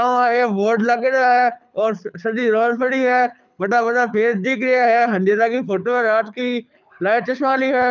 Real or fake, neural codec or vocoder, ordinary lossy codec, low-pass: fake; codec, 24 kHz, 6 kbps, HILCodec; none; 7.2 kHz